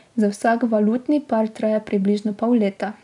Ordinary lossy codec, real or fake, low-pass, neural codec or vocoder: MP3, 96 kbps; real; 10.8 kHz; none